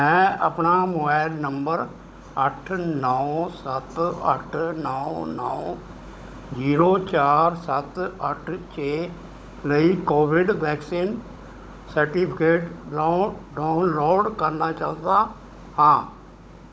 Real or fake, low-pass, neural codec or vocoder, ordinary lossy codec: fake; none; codec, 16 kHz, 16 kbps, FunCodec, trained on Chinese and English, 50 frames a second; none